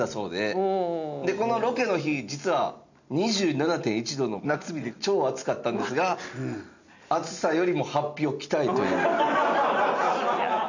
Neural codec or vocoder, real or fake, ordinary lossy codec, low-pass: none; real; none; 7.2 kHz